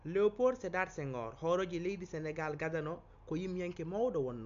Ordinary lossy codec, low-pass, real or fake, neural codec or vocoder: MP3, 64 kbps; 7.2 kHz; real; none